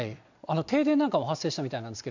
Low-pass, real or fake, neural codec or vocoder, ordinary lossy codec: 7.2 kHz; real; none; none